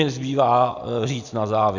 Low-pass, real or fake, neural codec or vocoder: 7.2 kHz; real; none